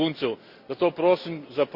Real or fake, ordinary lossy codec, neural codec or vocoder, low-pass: real; Opus, 64 kbps; none; 5.4 kHz